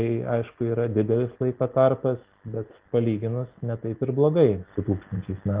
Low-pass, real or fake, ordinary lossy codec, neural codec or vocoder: 3.6 kHz; real; Opus, 32 kbps; none